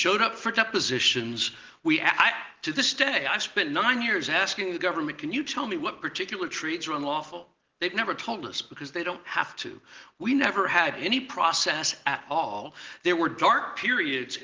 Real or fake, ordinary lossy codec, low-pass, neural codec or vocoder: real; Opus, 16 kbps; 7.2 kHz; none